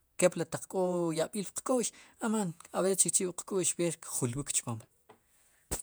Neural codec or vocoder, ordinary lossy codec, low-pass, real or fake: vocoder, 48 kHz, 128 mel bands, Vocos; none; none; fake